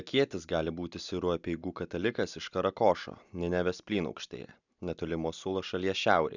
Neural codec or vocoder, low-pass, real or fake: none; 7.2 kHz; real